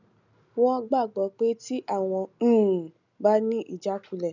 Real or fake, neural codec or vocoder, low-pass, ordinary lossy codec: real; none; 7.2 kHz; none